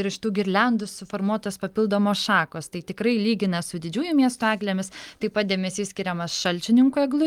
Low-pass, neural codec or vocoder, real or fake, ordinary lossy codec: 19.8 kHz; none; real; Opus, 32 kbps